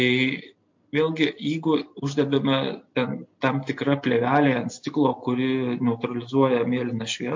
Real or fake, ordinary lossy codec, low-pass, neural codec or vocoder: real; MP3, 48 kbps; 7.2 kHz; none